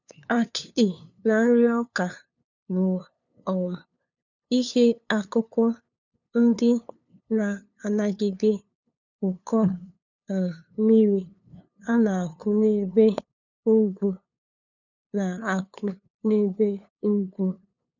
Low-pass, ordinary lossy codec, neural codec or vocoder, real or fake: 7.2 kHz; none; codec, 16 kHz, 2 kbps, FunCodec, trained on LibriTTS, 25 frames a second; fake